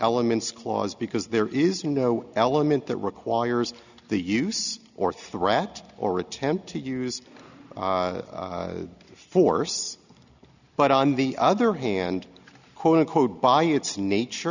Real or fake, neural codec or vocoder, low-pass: real; none; 7.2 kHz